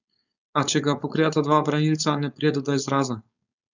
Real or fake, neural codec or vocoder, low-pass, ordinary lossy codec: fake; codec, 16 kHz, 4.8 kbps, FACodec; 7.2 kHz; none